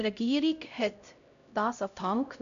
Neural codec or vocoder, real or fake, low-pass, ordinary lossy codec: codec, 16 kHz, 0.5 kbps, X-Codec, HuBERT features, trained on LibriSpeech; fake; 7.2 kHz; none